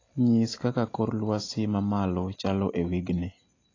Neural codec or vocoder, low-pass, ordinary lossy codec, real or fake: none; 7.2 kHz; AAC, 32 kbps; real